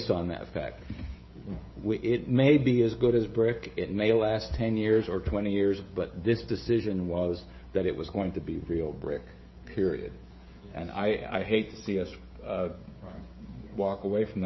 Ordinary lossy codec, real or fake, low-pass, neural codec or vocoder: MP3, 24 kbps; fake; 7.2 kHz; codec, 16 kHz, 16 kbps, FreqCodec, smaller model